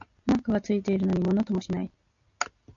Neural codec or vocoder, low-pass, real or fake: none; 7.2 kHz; real